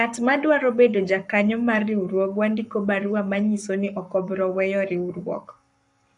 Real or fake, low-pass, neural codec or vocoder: fake; 10.8 kHz; codec, 44.1 kHz, 7.8 kbps, Pupu-Codec